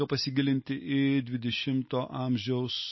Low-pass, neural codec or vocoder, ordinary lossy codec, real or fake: 7.2 kHz; none; MP3, 24 kbps; real